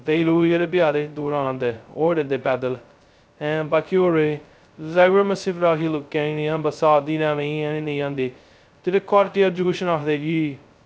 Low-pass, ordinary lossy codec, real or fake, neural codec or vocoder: none; none; fake; codec, 16 kHz, 0.2 kbps, FocalCodec